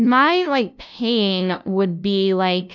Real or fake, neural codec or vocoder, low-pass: fake; codec, 16 kHz, 0.5 kbps, FunCodec, trained on LibriTTS, 25 frames a second; 7.2 kHz